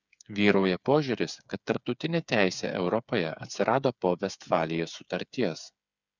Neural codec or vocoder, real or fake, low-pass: codec, 16 kHz, 8 kbps, FreqCodec, smaller model; fake; 7.2 kHz